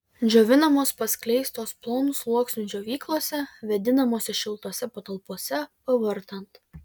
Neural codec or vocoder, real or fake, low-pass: none; real; 19.8 kHz